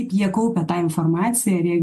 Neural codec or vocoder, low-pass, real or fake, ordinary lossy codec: none; 14.4 kHz; real; MP3, 64 kbps